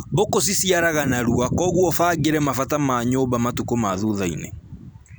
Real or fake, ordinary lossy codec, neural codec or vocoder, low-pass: fake; none; vocoder, 44.1 kHz, 128 mel bands every 256 samples, BigVGAN v2; none